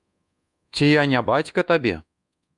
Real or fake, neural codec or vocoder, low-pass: fake; codec, 24 kHz, 1.2 kbps, DualCodec; 10.8 kHz